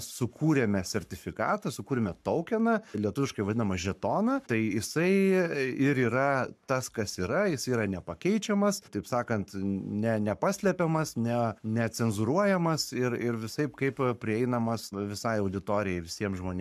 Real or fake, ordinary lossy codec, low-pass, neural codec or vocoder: fake; MP3, 96 kbps; 14.4 kHz; codec, 44.1 kHz, 7.8 kbps, Pupu-Codec